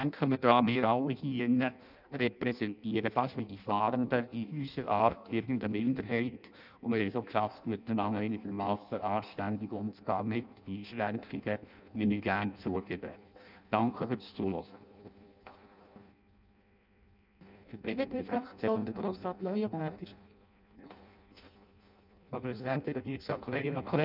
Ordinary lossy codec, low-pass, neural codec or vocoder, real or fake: none; 5.4 kHz; codec, 16 kHz in and 24 kHz out, 0.6 kbps, FireRedTTS-2 codec; fake